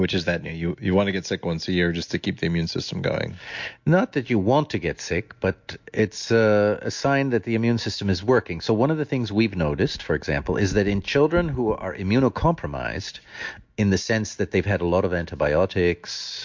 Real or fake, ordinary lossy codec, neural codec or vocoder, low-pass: real; MP3, 48 kbps; none; 7.2 kHz